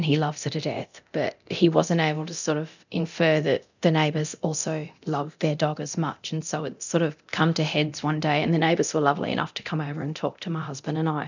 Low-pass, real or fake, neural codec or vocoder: 7.2 kHz; fake; codec, 24 kHz, 0.9 kbps, DualCodec